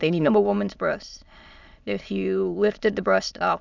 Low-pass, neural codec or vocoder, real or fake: 7.2 kHz; autoencoder, 22.05 kHz, a latent of 192 numbers a frame, VITS, trained on many speakers; fake